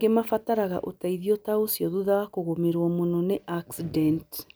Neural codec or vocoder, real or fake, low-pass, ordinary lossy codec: none; real; none; none